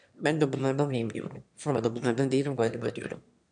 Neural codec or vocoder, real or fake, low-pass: autoencoder, 22.05 kHz, a latent of 192 numbers a frame, VITS, trained on one speaker; fake; 9.9 kHz